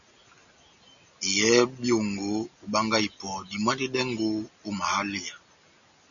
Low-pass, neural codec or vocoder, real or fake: 7.2 kHz; none; real